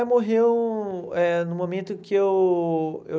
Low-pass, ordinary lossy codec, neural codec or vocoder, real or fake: none; none; none; real